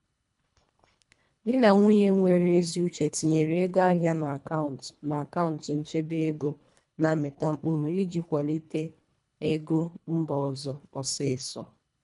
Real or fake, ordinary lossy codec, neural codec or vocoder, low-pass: fake; none; codec, 24 kHz, 1.5 kbps, HILCodec; 10.8 kHz